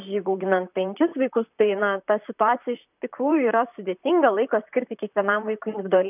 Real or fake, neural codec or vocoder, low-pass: fake; vocoder, 44.1 kHz, 128 mel bands, Pupu-Vocoder; 3.6 kHz